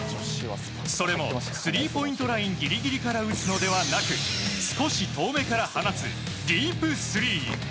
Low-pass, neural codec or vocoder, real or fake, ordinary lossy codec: none; none; real; none